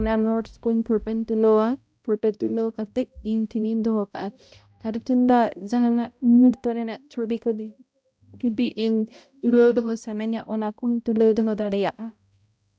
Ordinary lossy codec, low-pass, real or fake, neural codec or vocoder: none; none; fake; codec, 16 kHz, 0.5 kbps, X-Codec, HuBERT features, trained on balanced general audio